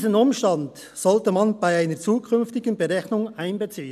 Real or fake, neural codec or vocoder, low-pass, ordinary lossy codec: real; none; 14.4 kHz; none